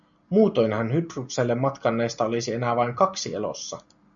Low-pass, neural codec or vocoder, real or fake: 7.2 kHz; none; real